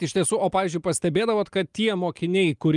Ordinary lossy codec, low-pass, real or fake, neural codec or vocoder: Opus, 32 kbps; 10.8 kHz; real; none